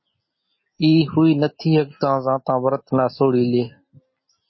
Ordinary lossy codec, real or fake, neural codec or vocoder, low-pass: MP3, 24 kbps; real; none; 7.2 kHz